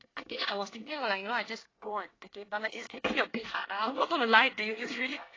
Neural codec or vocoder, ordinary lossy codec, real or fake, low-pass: codec, 24 kHz, 1 kbps, SNAC; AAC, 32 kbps; fake; 7.2 kHz